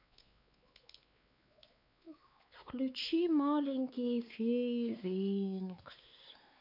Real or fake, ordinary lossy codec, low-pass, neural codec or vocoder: fake; none; 5.4 kHz; codec, 16 kHz, 4 kbps, X-Codec, WavLM features, trained on Multilingual LibriSpeech